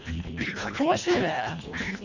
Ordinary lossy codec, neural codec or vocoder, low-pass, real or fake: none; codec, 24 kHz, 1.5 kbps, HILCodec; 7.2 kHz; fake